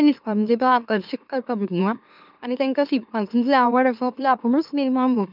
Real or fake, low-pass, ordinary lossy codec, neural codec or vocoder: fake; 5.4 kHz; none; autoencoder, 44.1 kHz, a latent of 192 numbers a frame, MeloTTS